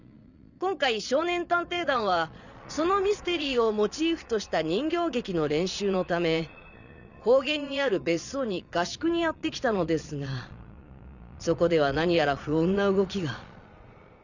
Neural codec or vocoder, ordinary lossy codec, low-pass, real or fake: vocoder, 22.05 kHz, 80 mel bands, Vocos; none; 7.2 kHz; fake